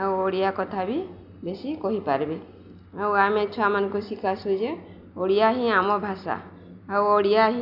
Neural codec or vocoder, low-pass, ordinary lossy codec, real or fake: none; 5.4 kHz; AAC, 48 kbps; real